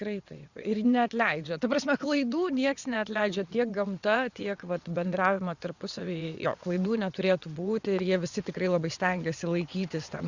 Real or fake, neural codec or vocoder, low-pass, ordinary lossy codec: fake; vocoder, 22.05 kHz, 80 mel bands, WaveNeXt; 7.2 kHz; Opus, 64 kbps